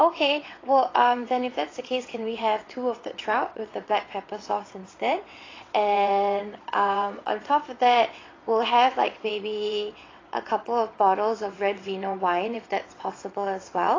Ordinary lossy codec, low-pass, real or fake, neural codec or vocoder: AAC, 32 kbps; 7.2 kHz; fake; vocoder, 22.05 kHz, 80 mel bands, WaveNeXt